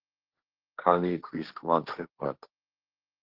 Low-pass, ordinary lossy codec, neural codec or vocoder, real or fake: 5.4 kHz; Opus, 16 kbps; codec, 16 kHz, 1.1 kbps, Voila-Tokenizer; fake